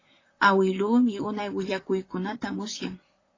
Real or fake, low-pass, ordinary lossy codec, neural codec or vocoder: fake; 7.2 kHz; AAC, 32 kbps; vocoder, 44.1 kHz, 128 mel bands, Pupu-Vocoder